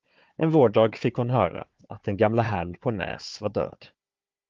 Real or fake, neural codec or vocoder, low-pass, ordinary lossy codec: fake; codec, 16 kHz, 4 kbps, FunCodec, trained on Chinese and English, 50 frames a second; 7.2 kHz; Opus, 32 kbps